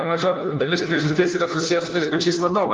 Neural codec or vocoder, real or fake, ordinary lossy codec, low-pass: codec, 16 kHz, 1 kbps, FunCodec, trained on LibriTTS, 50 frames a second; fake; Opus, 32 kbps; 7.2 kHz